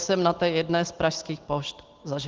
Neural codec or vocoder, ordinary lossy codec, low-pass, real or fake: none; Opus, 16 kbps; 7.2 kHz; real